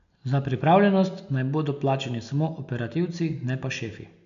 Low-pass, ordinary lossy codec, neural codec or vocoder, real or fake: 7.2 kHz; AAC, 96 kbps; none; real